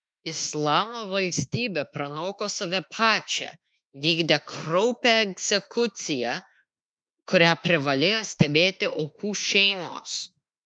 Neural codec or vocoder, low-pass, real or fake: autoencoder, 48 kHz, 32 numbers a frame, DAC-VAE, trained on Japanese speech; 9.9 kHz; fake